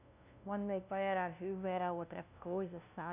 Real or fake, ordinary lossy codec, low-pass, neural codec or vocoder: fake; none; 3.6 kHz; codec, 16 kHz, 0.5 kbps, FunCodec, trained on LibriTTS, 25 frames a second